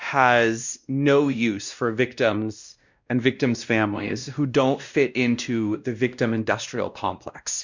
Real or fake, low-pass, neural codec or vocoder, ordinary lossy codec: fake; 7.2 kHz; codec, 16 kHz, 1 kbps, X-Codec, WavLM features, trained on Multilingual LibriSpeech; Opus, 64 kbps